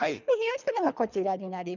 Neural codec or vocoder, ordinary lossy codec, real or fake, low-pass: codec, 24 kHz, 3 kbps, HILCodec; none; fake; 7.2 kHz